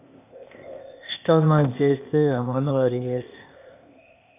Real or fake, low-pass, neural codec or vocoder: fake; 3.6 kHz; codec, 16 kHz, 0.8 kbps, ZipCodec